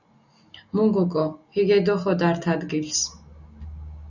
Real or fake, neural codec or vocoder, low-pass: real; none; 7.2 kHz